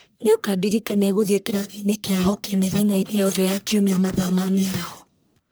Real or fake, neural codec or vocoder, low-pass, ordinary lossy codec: fake; codec, 44.1 kHz, 1.7 kbps, Pupu-Codec; none; none